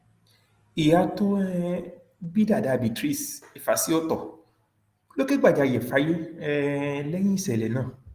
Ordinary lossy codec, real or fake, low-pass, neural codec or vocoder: Opus, 24 kbps; real; 14.4 kHz; none